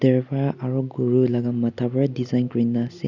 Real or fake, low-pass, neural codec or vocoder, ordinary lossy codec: real; 7.2 kHz; none; none